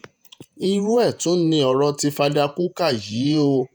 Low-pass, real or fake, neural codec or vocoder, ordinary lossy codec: none; fake; vocoder, 48 kHz, 128 mel bands, Vocos; none